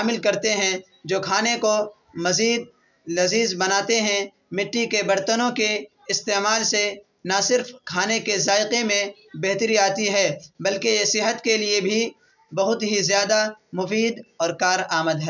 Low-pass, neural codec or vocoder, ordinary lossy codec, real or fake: 7.2 kHz; none; none; real